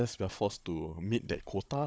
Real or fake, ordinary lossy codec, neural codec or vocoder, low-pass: fake; none; codec, 16 kHz, 16 kbps, FunCodec, trained on Chinese and English, 50 frames a second; none